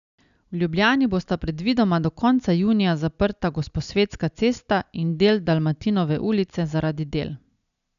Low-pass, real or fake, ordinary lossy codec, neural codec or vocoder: 7.2 kHz; real; none; none